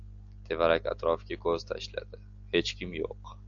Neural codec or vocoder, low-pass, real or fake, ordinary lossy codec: none; 7.2 kHz; real; AAC, 64 kbps